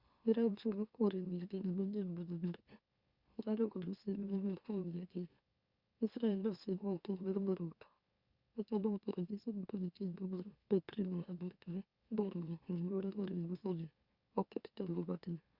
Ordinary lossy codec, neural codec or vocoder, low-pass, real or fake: Opus, 64 kbps; autoencoder, 44.1 kHz, a latent of 192 numbers a frame, MeloTTS; 5.4 kHz; fake